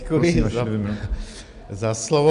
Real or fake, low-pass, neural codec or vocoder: real; 10.8 kHz; none